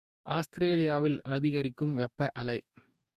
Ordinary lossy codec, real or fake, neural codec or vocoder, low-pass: Opus, 64 kbps; fake; codec, 44.1 kHz, 2.6 kbps, DAC; 14.4 kHz